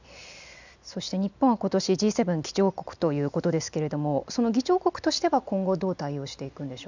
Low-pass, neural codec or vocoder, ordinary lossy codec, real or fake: 7.2 kHz; none; none; real